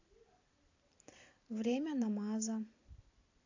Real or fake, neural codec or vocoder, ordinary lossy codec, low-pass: fake; vocoder, 44.1 kHz, 128 mel bands every 256 samples, BigVGAN v2; AAC, 48 kbps; 7.2 kHz